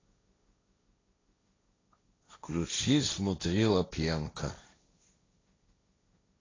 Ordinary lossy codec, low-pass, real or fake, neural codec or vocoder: AAC, 32 kbps; 7.2 kHz; fake; codec, 16 kHz, 1.1 kbps, Voila-Tokenizer